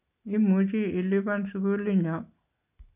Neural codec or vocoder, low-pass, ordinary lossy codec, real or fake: none; 3.6 kHz; none; real